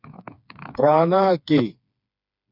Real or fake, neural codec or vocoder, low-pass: fake; codec, 16 kHz, 4 kbps, FreqCodec, smaller model; 5.4 kHz